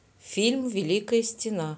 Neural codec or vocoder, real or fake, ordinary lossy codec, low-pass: none; real; none; none